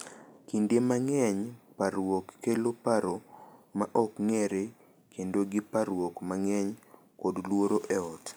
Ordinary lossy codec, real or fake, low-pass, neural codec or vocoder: none; real; none; none